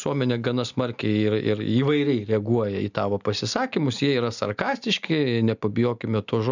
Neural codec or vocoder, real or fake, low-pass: none; real; 7.2 kHz